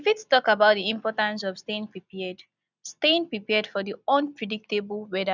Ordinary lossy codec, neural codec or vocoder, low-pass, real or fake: none; none; 7.2 kHz; real